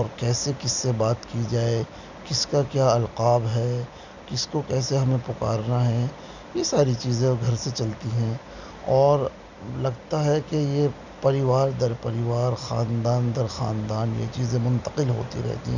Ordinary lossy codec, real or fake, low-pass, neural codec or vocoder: none; real; 7.2 kHz; none